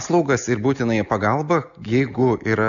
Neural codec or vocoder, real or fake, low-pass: none; real; 7.2 kHz